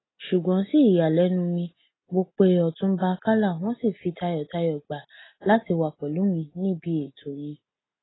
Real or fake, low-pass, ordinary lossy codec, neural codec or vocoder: real; 7.2 kHz; AAC, 16 kbps; none